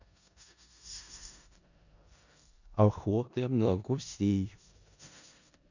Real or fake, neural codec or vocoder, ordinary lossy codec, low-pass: fake; codec, 16 kHz in and 24 kHz out, 0.4 kbps, LongCat-Audio-Codec, four codebook decoder; none; 7.2 kHz